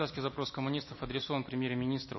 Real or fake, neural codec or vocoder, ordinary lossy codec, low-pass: real; none; MP3, 24 kbps; 7.2 kHz